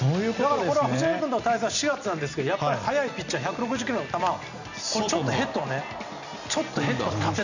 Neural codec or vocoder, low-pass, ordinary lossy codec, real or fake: none; 7.2 kHz; none; real